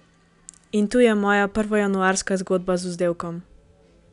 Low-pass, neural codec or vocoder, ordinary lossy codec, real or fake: 10.8 kHz; none; none; real